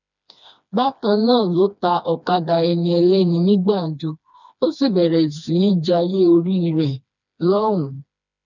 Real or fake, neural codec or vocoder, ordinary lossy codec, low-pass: fake; codec, 16 kHz, 2 kbps, FreqCodec, smaller model; none; 7.2 kHz